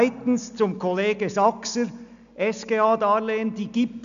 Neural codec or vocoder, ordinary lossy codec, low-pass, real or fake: none; none; 7.2 kHz; real